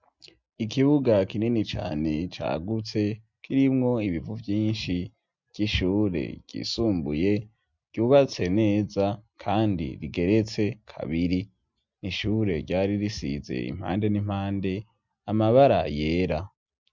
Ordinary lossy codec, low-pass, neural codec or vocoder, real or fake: MP3, 64 kbps; 7.2 kHz; none; real